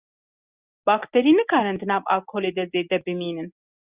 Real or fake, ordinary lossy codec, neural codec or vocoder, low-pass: real; Opus, 64 kbps; none; 3.6 kHz